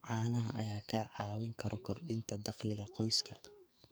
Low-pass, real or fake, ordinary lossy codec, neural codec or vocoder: none; fake; none; codec, 44.1 kHz, 2.6 kbps, SNAC